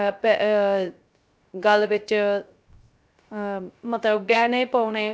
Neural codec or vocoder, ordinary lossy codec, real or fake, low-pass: codec, 16 kHz, 0.3 kbps, FocalCodec; none; fake; none